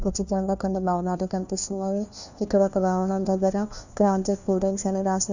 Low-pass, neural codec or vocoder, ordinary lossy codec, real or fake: 7.2 kHz; codec, 16 kHz, 1 kbps, FunCodec, trained on LibriTTS, 50 frames a second; none; fake